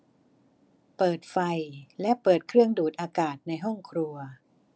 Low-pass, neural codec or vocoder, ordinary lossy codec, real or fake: none; none; none; real